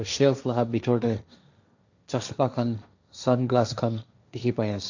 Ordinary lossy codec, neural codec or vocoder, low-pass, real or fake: none; codec, 16 kHz, 1.1 kbps, Voila-Tokenizer; 7.2 kHz; fake